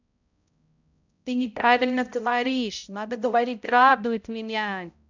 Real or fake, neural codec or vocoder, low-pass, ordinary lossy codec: fake; codec, 16 kHz, 0.5 kbps, X-Codec, HuBERT features, trained on balanced general audio; 7.2 kHz; none